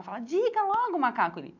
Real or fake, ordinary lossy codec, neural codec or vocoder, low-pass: real; none; none; 7.2 kHz